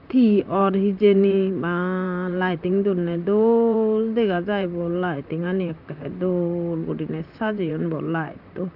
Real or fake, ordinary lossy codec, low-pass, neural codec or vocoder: fake; none; 5.4 kHz; vocoder, 44.1 kHz, 128 mel bands, Pupu-Vocoder